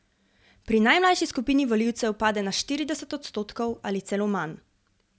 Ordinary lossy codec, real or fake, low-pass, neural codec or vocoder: none; real; none; none